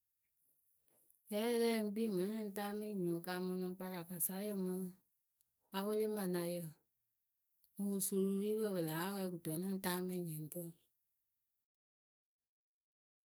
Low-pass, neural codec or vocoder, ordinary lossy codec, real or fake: none; codec, 44.1 kHz, 2.6 kbps, SNAC; none; fake